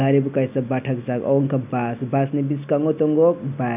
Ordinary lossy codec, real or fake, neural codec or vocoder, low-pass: none; real; none; 3.6 kHz